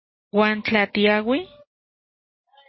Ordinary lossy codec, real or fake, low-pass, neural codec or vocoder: MP3, 24 kbps; real; 7.2 kHz; none